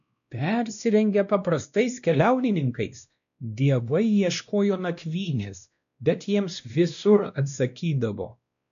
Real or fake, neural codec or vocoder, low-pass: fake; codec, 16 kHz, 1 kbps, X-Codec, WavLM features, trained on Multilingual LibriSpeech; 7.2 kHz